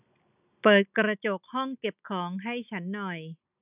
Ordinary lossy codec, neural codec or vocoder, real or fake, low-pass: none; none; real; 3.6 kHz